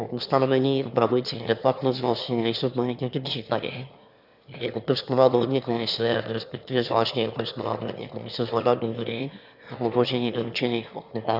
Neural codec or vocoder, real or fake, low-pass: autoencoder, 22.05 kHz, a latent of 192 numbers a frame, VITS, trained on one speaker; fake; 5.4 kHz